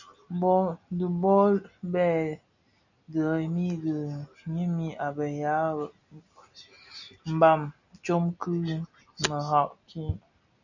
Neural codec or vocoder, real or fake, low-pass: none; real; 7.2 kHz